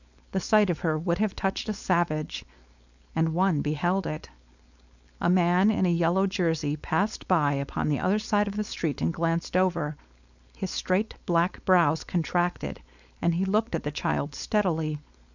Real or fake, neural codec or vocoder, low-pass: fake; codec, 16 kHz, 4.8 kbps, FACodec; 7.2 kHz